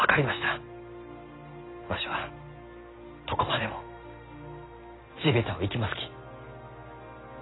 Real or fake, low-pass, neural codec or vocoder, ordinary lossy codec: real; 7.2 kHz; none; AAC, 16 kbps